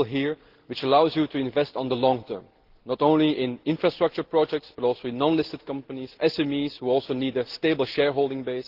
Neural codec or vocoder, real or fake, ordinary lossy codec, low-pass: none; real; Opus, 16 kbps; 5.4 kHz